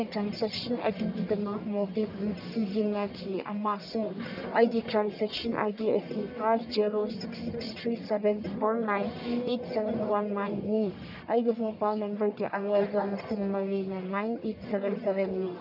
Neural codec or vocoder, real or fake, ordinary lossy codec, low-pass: codec, 44.1 kHz, 1.7 kbps, Pupu-Codec; fake; none; 5.4 kHz